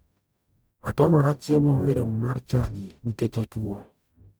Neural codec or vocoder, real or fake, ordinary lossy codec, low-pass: codec, 44.1 kHz, 0.9 kbps, DAC; fake; none; none